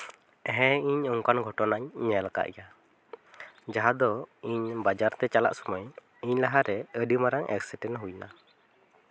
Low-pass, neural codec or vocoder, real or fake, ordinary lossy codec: none; none; real; none